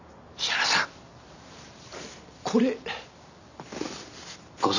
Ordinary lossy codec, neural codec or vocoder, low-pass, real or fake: none; none; 7.2 kHz; real